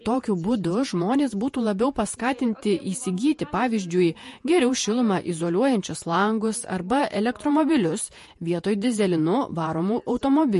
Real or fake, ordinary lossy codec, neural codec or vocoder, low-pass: fake; MP3, 48 kbps; vocoder, 48 kHz, 128 mel bands, Vocos; 14.4 kHz